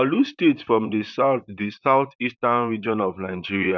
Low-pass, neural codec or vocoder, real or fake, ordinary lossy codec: 7.2 kHz; vocoder, 44.1 kHz, 128 mel bands, Pupu-Vocoder; fake; none